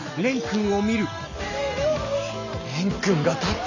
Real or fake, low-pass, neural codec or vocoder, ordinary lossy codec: real; 7.2 kHz; none; none